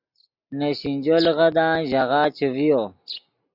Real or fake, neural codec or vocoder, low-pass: real; none; 5.4 kHz